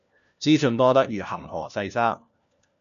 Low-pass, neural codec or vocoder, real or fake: 7.2 kHz; codec, 16 kHz, 1 kbps, FunCodec, trained on LibriTTS, 50 frames a second; fake